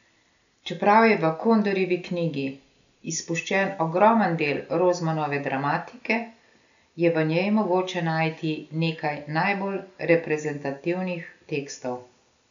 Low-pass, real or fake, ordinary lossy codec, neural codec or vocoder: 7.2 kHz; real; none; none